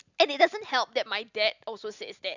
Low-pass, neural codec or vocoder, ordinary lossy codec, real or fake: 7.2 kHz; none; none; real